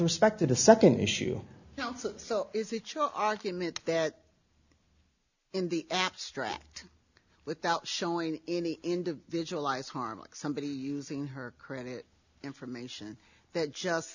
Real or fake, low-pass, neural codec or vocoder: real; 7.2 kHz; none